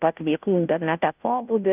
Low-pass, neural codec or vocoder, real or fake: 3.6 kHz; codec, 16 kHz, 0.5 kbps, FunCodec, trained on Chinese and English, 25 frames a second; fake